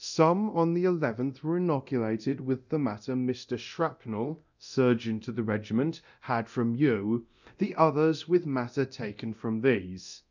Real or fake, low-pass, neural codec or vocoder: fake; 7.2 kHz; codec, 24 kHz, 0.9 kbps, DualCodec